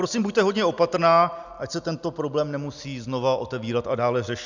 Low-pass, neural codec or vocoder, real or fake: 7.2 kHz; none; real